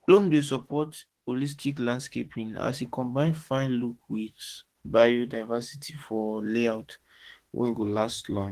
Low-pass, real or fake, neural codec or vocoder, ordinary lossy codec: 14.4 kHz; fake; autoencoder, 48 kHz, 32 numbers a frame, DAC-VAE, trained on Japanese speech; Opus, 16 kbps